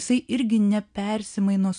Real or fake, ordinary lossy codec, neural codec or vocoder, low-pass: real; MP3, 96 kbps; none; 9.9 kHz